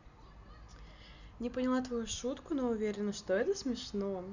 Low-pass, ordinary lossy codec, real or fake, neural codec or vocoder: 7.2 kHz; none; real; none